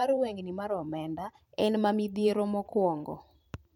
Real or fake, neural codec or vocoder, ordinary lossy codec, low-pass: fake; vocoder, 44.1 kHz, 128 mel bands every 512 samples, BigVGAN v2; MP3, 64 kbps; 19.8 kHz